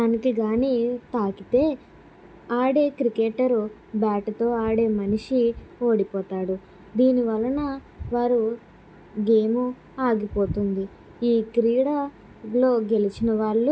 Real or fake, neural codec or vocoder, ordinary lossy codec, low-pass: real; none; none; none